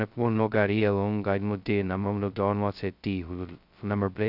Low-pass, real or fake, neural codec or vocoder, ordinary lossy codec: 5.4 kHz; fake; codec, 16 kHz, 0.2 kbps, FocalCodec; none